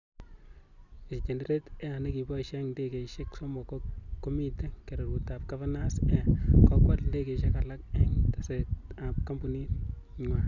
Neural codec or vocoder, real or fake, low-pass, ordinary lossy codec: none; real; 7.2 kHz; none